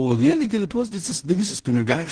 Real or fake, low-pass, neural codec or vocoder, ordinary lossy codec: fake; 9.9 kHz; codec, 16 kHz in and 24 kHz out, 0.4 kbps, LongCat-Audio-Codec, two codebook decoder; Opus, 16 kbps